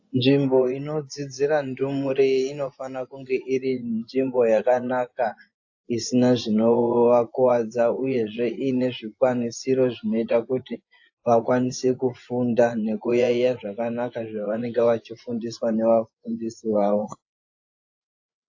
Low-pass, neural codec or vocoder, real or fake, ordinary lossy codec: 7.2 kHz; vocoder, 24 kHz, 100 mel bands, Vocos; fake; AAC, 48 kbps